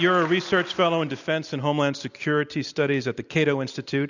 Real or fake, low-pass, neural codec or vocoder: real; 7.2 kHz; none